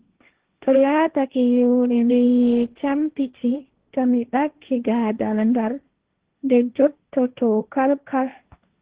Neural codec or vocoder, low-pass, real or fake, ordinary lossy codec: codec, 16 kHz, 1.1 kbps, Voila-Tokenizer; 3.6 kHz; fake; Opus, 16 kbps